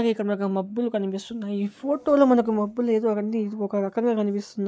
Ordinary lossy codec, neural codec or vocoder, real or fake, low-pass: none; none; real; none